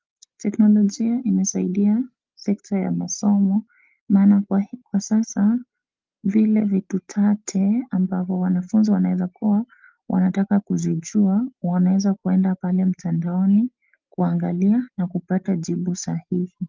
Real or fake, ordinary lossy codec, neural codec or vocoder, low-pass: real; Opus, 32 kbps; none; 7.2 kHz